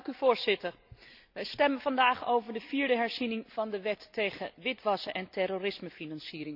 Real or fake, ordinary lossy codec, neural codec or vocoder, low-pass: real; none; none; 5.4 kHz